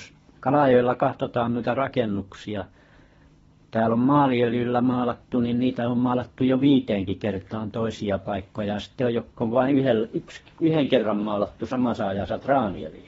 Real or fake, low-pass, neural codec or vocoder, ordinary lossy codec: fake; 10.8 kHz; codec, 24 kHz, 3 kbps, HILCodec; AAC, 24 kbps